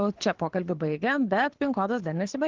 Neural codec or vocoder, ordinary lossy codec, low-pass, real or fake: codec, 24 kHz, 6 kbps, HILCodec; Opus, 16 kbps; 7.2 kHz; fake